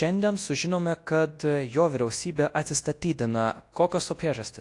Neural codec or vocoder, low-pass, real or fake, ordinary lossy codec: codec, 24 kHz, 0.9 kbps, WavTokenizer, large speech release; 10.8 kHz; fake; AAC, 48 kbps